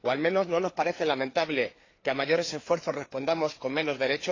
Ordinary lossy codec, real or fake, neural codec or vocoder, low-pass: AAC, 32 kbps; fake; codec, 16 kHz, 4 kbps, FreqCodec, larger model; 7.2 kHz